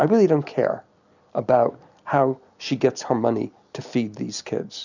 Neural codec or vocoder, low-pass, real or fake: none; 7.2 kHz; real